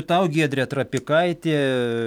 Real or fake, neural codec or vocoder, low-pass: real; none; 19.8 kHz